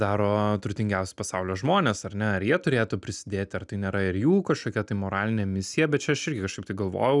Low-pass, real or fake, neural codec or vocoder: 10.8 kHz; real; none